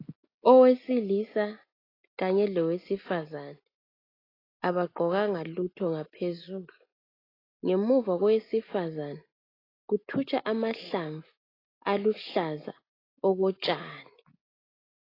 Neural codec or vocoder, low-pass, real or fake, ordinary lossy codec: none; 5.4 kHz; real; AAC, 24 kbps